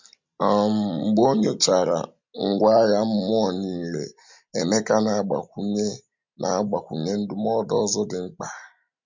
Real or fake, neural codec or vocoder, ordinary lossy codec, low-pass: real; none; MP3, 64 kbps; 7.2 kHz